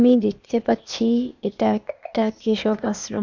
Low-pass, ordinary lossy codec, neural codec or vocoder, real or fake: 7.2 kHz; none; codec, 16 kHz, 0.8 kbps, ZipCodec; fake